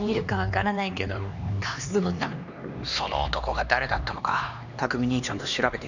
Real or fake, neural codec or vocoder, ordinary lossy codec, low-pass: fake; codec, 16 kHz, 2 kbps, X-Codec, HuBERT features, trained on LibriSpeech; none; 7.2 kHz